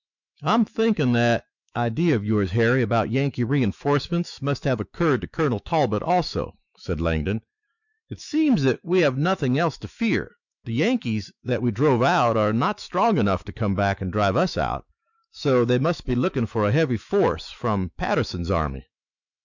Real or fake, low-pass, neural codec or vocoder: fake; 7.2 kHz; autoencoder, 48 kHz, 128 numbers a frame, DAC-VAE, trained on Japanese speech